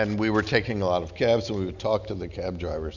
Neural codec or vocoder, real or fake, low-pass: none; real; 7.2 kHz